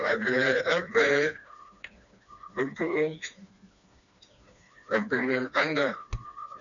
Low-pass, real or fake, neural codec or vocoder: 7.2 kHz; fake; codec, 16 kHz, 2 kbps, FreqCodec, smaller model